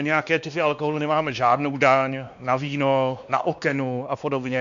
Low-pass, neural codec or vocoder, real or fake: 7.2 kHz; codec, 16 kHz, 2 kbps, X-Codec, WavLM features, trained on Multilingual LibriSpeech; fake